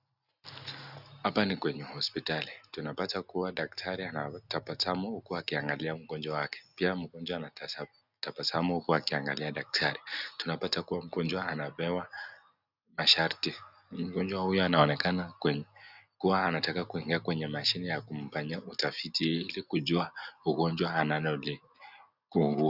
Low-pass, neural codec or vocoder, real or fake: 5.4 kHz; none; real